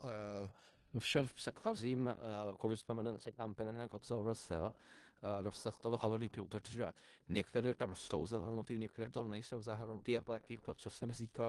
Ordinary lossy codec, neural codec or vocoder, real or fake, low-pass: Opus, 24 kbps; codec, 16 kHz in and 24 kHz out, 0.4 kbps, LongCat-Audio-Codec, four codebook decoder; fake; 10.8 kHz